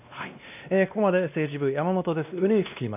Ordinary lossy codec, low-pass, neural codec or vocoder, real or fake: none; 3.6 kHz; codec, 16 kHz, 1 kbps, X-Codec, WavLM features, trained on Multilingual LibriSpeech; fake